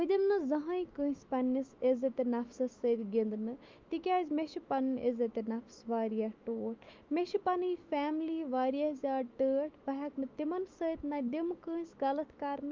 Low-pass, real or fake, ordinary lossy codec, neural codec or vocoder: 7.2 kHz; fake; Opus, 24 kbps; autoencoder, 48 kHz, 128 numbers a frame, DAC-VAE, trained on Japanese speech